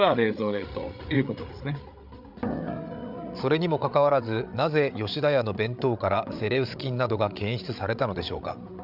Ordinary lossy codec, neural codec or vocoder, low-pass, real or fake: none; codec, 16 kHz, 8 kbps, FreqCodec, larger model; 5.4 kHz; fake